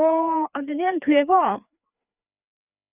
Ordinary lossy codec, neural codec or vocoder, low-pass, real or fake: none; codec, 16 kHz, 2 kbps, FreqCodec, larger model; 3.6 kHz; fake